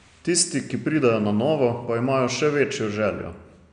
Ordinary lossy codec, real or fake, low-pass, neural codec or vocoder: none; real; 9.9 kHz; none